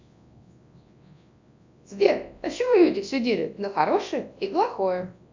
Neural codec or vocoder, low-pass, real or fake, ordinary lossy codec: codec, 24 kHz, 0.9 kbps, WavTokenizer, large speech release; 7.2 kHz; fake; MP3, 64 kbps